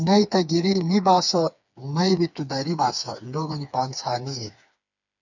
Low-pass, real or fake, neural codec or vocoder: 7.2 kHz; fake; codec, 16 kHz, 4 kbps, FreqCodec, smaller model